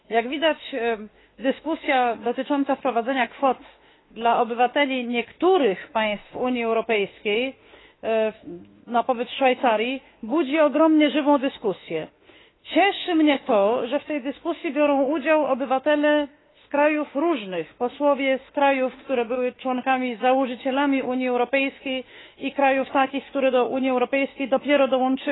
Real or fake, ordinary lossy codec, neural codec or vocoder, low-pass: fake; AAC, 16 kbps; autoencoder, 48 kHz, 32 numbers a frame, DAC-VAE, trained on Japanese speech; 7.2 kHz